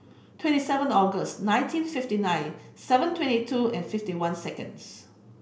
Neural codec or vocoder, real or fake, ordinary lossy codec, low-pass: none; real; none; none